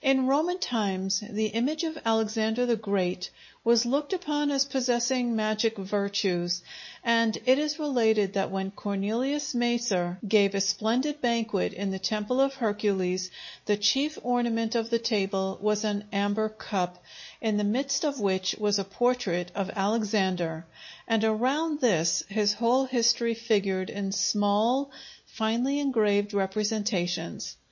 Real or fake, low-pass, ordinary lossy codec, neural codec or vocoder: real; 7.2 kHz; MP3, 32 kbps; none